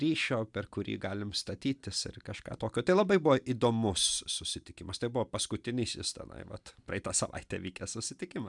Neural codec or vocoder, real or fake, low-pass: none; real; 10.8 kHz